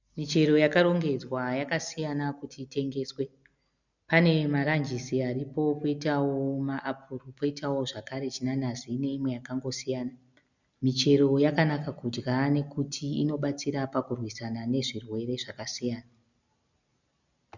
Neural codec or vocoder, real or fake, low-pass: none; real; 7.2 kHz